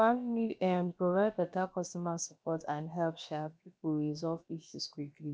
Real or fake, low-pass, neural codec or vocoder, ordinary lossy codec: fake; none; codec, 16 kHz, about 1 kbps, DyCAST, with the encoder's durations; none